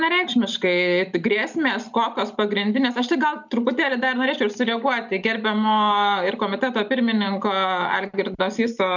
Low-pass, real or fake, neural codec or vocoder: 7.2 kHz; real; none